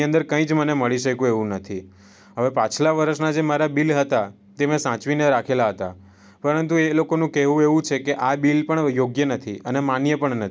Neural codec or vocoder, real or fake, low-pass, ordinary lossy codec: none; real; none; none